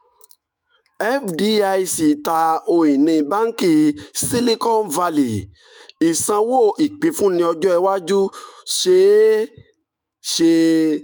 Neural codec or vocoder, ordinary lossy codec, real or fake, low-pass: autoencoder, 48 kHz, 128 numbers a frame, DAC-VAE, trained on Japanese speech; none; fake; none